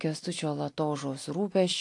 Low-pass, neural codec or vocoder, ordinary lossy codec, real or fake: 10.8 kHz; none; AAC, 48 kbps; real